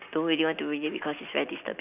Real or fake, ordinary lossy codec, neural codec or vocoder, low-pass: real; none; none; 3.6 kHz